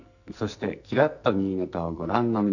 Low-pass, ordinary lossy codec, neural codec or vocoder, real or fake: 7.2 kHz; none; codec, 44.1 kHz, 2.6 kbps, SNAC; fake